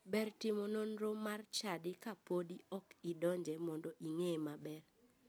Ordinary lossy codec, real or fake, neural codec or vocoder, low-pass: none; real; none; none